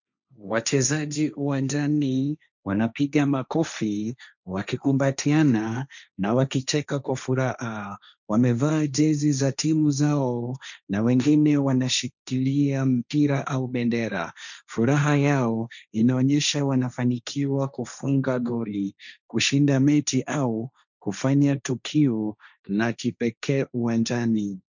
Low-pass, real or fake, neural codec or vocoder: 7.2 kHz; fake; codec, 16 kHz, 1.1 kbps, Voila-Tokenizer